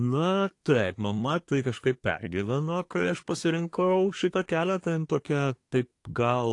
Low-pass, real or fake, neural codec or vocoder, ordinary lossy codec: 10.8 kHz; fake; codec, 24 kHz, 1 kbps, SNAC; AAC, 48 kbps